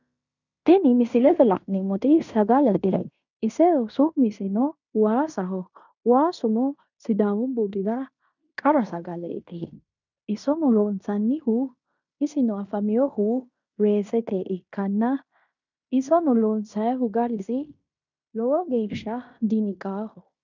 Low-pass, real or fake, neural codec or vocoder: 7.2 kHz; fake; codec, 16 kHz in and 24 kHz out, 0.9 kbps, LongCat-Audio-Codec, fine tuned four codebook decoder